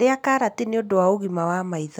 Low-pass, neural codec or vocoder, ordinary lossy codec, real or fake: 19.8 kHz; none; none; real